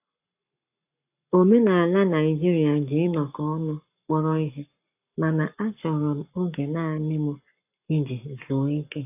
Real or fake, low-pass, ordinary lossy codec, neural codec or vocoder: fake; 3.6 kHz; AAC, 32 kbps; codec, 44.1 kHz, 7.8 kbps, Pupu-Codec